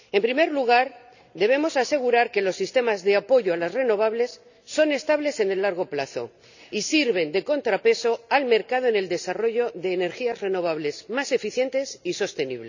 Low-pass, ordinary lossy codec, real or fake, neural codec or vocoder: 7.2 kHz; none; real; none